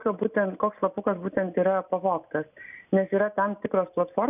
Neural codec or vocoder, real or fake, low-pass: none; real; 3.6 kHz